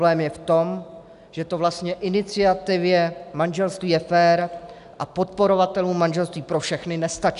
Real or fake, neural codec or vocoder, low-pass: real; none; 10.8 kHz